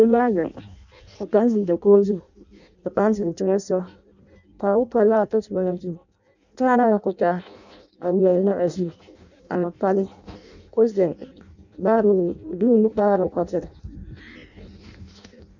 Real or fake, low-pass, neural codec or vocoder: fake; 7.2 kHz; codec, 16 kHz in and 24 kHz out, 0.6 kbps, FireRedTTS-2 codec